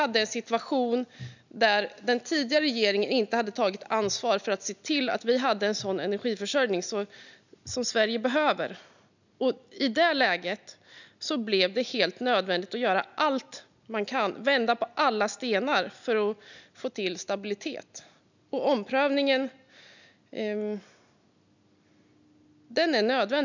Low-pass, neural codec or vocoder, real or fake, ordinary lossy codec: 7.2 kHz; none; real; none